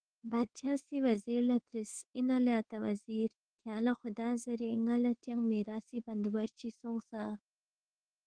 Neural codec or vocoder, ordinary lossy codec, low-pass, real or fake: codec, 24 kHz, 1.2 kbps, DualCodec; Opus, 16 kbps; 9.9 kHz; fake